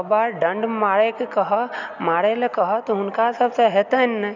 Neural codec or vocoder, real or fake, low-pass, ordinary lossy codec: none; real; 7.2 kHz; none